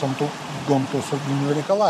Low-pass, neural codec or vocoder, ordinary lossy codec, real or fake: 14.4 kHz; vocoder, 44.1 kHz, 128 mel bands, Pupu-Vocoder; MP3, 64 kbps; fake